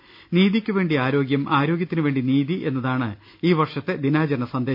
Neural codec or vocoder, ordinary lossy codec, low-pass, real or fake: none; AAC, 48 kbps; 5.4 kHz; real